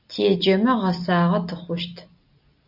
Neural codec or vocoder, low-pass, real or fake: none; 5.4 kHz; real